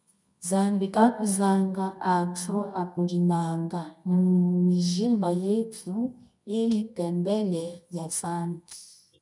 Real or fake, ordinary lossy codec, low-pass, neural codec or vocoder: fake; MP3, 96 kbps; 10.8 kHz; codec, 24 kHz, 0.9 kbps, WavTokenizer, medium music audio release